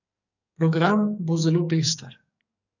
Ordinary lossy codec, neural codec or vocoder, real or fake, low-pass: none; codec, 44.1 kHz, 2.6 kbps, SNAC; fake; 7.2 kHz